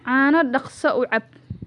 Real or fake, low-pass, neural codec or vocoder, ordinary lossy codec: real; 10.8 kHz; none; none